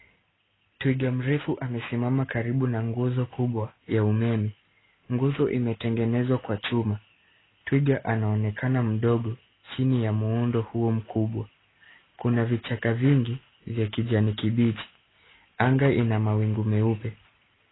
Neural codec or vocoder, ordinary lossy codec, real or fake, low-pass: none; AAC, 16 kbps; real; 7.2 kHz